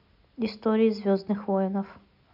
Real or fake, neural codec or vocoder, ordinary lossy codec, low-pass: real; none; none; 5.4 kHz